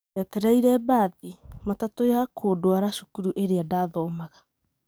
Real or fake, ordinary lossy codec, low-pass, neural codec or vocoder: fake; none; none; codec, 44.1 kHz, 7.8 kbps, DAC